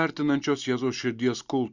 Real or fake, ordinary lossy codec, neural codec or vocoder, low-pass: real; Opus, 64 kbps; none; 7.2 kHz